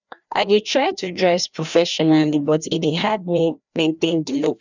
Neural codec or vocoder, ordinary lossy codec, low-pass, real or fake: codec, 16 kHz, 1 kbps, FreqCodec, larger model; none; 7.2 kHz; fake